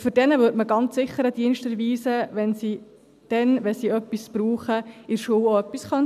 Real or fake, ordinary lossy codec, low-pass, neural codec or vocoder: real; none; 14.4 kHz; none